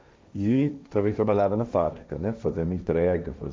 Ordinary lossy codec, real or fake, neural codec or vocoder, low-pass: none; fake; codec, 16 kHz, 1.1 kbps, Voila-Tokenizer; none